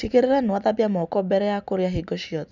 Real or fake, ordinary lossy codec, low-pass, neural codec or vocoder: real; none; 7.2 kHz; none